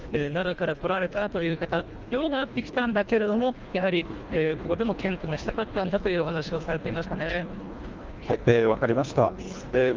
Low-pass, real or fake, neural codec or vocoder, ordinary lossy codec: 7.2 kHz; fake; codec, 24 kHz, 1.5 kbps, HILCodec; Opus, 24 kbps